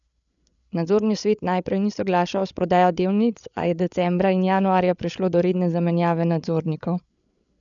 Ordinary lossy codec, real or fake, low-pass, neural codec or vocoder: none; fake; 7.2 kHz; codec, 16 kHz, 8 kbps, FreqCodec, larger model